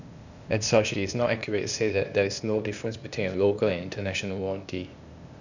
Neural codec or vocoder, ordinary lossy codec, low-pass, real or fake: codec, 16 kHz, 0.8 kbps, ZipCodec; none; 7.2 kHz; fake